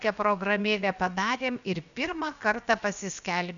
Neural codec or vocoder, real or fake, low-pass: codec, 16 kHz, about 1 kbps, DyCAST, with the encoder's durations; fake; 7.2 kHz